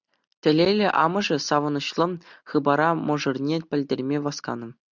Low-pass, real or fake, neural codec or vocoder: 7.2 kHz; real; none